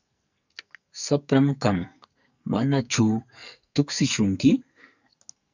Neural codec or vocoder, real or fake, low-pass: codec, 44.1 kHz, 2.6 kbps, SNAC; fake; 7.2 kHz